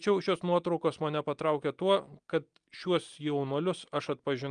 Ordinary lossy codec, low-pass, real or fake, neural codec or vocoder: Opus, 32 kbps; 9.9 kHz; real; none